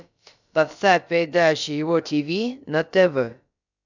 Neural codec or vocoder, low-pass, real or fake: codec, 16 kHz, about 1 kbps, DyCAST, with the encoder's durations; 7.2 kHz; fake